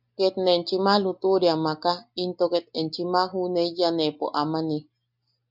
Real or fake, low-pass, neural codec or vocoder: real; 5.4 kHz; none